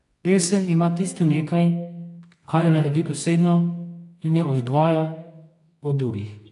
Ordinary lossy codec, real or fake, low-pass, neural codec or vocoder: AAC, 64 kbps; fake; 10.8 kHz; codec, 24 kHz, 0.9 kbps, WavTokenizer, medium music audio release